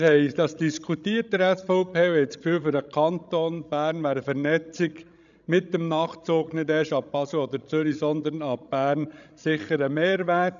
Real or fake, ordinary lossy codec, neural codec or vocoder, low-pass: fake; none; codec, 16 kHz, 16 kbps, FreqCodec, larger model; 7.2 kHz